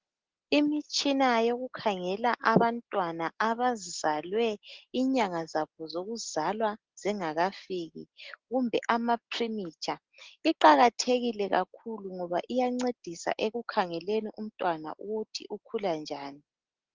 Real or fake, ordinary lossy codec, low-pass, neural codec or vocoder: real; Opus, 16 kbps; 7.2 kHz; none